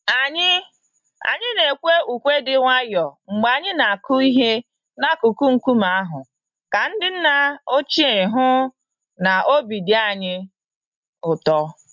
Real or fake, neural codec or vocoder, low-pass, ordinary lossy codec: real; none; 7.2 kHz; MP3, 64 kbps